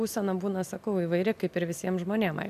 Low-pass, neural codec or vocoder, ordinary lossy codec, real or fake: 14.4 kHz; none; AAC, 96 kbps; real